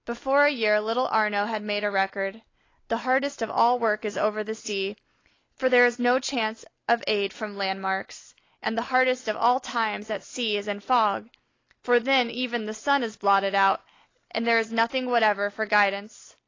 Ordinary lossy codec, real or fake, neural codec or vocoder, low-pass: AAC, 32 kbps; real; none; 7.2 kHz